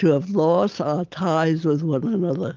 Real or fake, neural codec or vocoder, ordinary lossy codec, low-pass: real; none; Opus, 32 kbps; 7.2 kHz